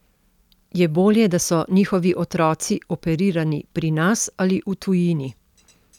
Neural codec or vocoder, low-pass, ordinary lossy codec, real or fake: none; 19.8 kHz; none; real